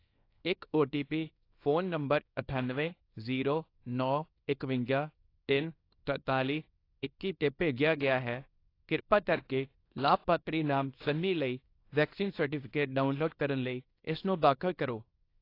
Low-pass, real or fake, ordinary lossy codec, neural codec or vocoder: 5.4 kHz; fake; AAC, 32 kbps; codec, 24 kHz, 0.9 kbps, WavTokenizer, small release